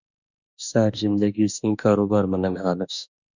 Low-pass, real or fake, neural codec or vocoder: 7.2 kHz; fake; autoencoder, 48 kHz, 32 numbers a frame, DAC-VAE, trained on Japanese speech